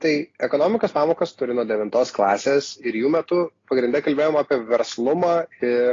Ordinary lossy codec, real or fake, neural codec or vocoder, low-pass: AAC, 32 kbps; real; none; 7.2 kHz